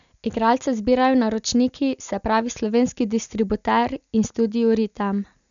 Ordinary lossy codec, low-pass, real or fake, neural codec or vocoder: none; 7.2 kHz; real; none